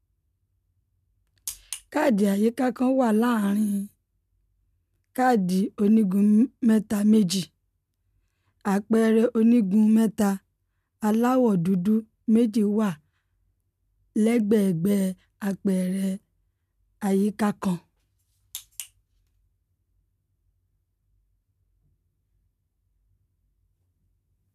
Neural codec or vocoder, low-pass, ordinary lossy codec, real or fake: none; 14.4 kHz; none; real